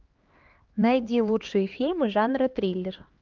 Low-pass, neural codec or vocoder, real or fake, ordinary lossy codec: 7.2 kHz; codec, 16 kHz, 2 kbps, X-Codec, HuBERT features, trained on LibriSpeech; fake; Opus, 32 kbps